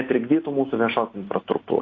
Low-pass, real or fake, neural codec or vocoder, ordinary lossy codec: 7.2 kHz; real; none; AAC, 32 kbps